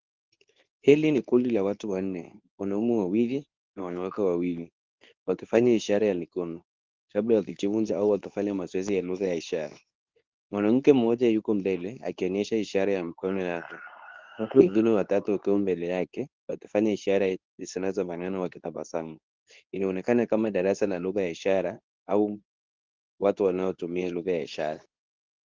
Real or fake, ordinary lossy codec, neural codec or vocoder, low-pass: fake; Opus, 32 kbps; codec, 24 kHz, 0.9 kbps, WavTokenizer, medium speech release version 2; 7.2 kHz